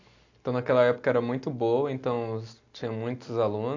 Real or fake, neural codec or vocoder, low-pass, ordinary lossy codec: real; none; 7.2 kHz; AAC, 48 kbps